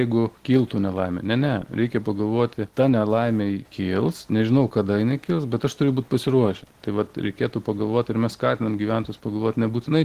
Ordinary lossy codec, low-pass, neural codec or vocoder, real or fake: Opus, 16 kbps; 14.4 kHz; none; real